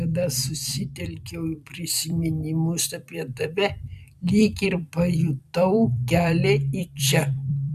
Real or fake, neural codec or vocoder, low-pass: fake; vocoder, 44.1 kHz, 128 mel bands every 256 samples, BigVGAN v2; 14.4 kHz